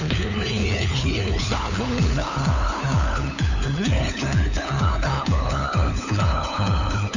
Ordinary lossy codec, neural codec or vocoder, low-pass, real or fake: none; codec, 16 kHz, 4 kbps, FunCodec, trained on LibriTTS, 50 frames a second; 7.2 kHz; fake